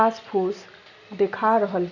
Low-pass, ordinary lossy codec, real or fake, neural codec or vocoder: 7.2 kHz; none; real; none